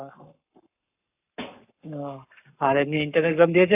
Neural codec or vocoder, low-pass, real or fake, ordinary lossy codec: codec, 44.1 kHz, 7.8 kbps, Pupu-Codec; 3.6 kHz; fake; AAC, 24 kbps